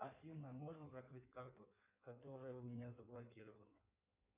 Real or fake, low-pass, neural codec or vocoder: fake; 3.6 kHz; codec, 16 kHz in and 24 kHz out, 1.1 kbps, FireRedTTS-2 codec